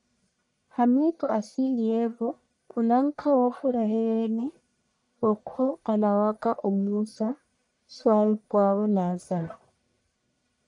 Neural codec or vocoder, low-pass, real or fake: codec, 44.1 kHz, 1.7 kbps, Pupu-Codec; 10.8 kHz; fake